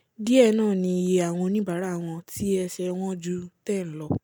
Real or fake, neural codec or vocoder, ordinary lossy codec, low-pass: real; none; none; none